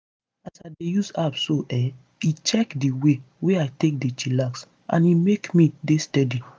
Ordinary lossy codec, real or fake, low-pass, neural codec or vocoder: none; real; none; none